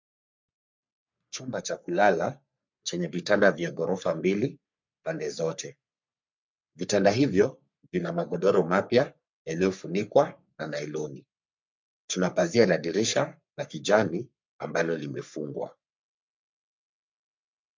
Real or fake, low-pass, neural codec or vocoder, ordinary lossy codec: fake; 7.2 kHz; codec, 44.1 kHz, 3.4 kbps, Pupu-Codec; MP3, 64 kbps